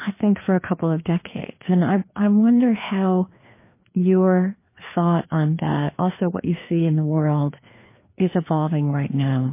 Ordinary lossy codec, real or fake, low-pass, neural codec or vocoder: MP3, 24 kbps; fake; 3.6 kHz; codec, 16 kHz, 2 kbps, FreqCodec, larger model